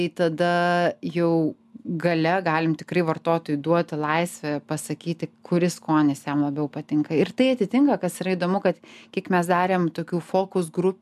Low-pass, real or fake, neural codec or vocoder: 14.4 kHz; real; none